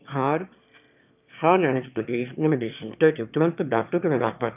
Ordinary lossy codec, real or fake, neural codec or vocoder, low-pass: none; fake; autoencoder, 22.05 kHz, a latent of 192 numbers a frame, VITS, trained on one speaker; 3.6 kHz